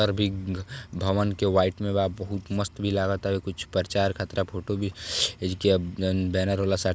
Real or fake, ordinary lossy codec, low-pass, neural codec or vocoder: real; none; none; none